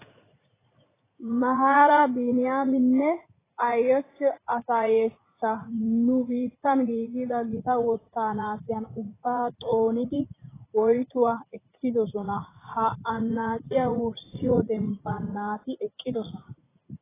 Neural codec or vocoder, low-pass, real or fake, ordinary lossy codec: vocoder, 44.1 kHz, 80 mel bands, Vocos; 3.6 kHz; fake; AAC, 16 kbps